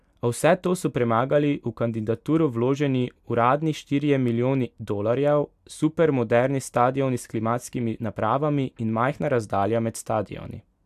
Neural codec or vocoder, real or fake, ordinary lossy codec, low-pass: none; real; AAC, 96 kbps; 14.4 kHz